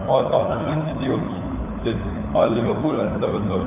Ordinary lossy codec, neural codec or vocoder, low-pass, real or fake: none; codec, 16 kHz, 4 kbps, FunCodec, trained on LibriTTS, 50 frames a second; 3.6 kHz; fake